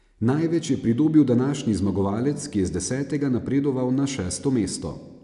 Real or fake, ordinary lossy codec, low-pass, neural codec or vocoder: real; none; 10.8 kHz; none